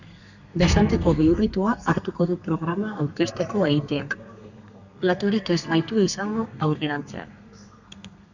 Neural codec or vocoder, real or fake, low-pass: codec, 32 kHz, 1.9 kbps, SNAC; fake; 7.2 kHz